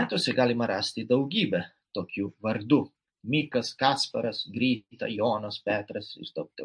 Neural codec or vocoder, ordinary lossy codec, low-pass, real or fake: none; MP3, 48 kbps; 9.9 kHz; real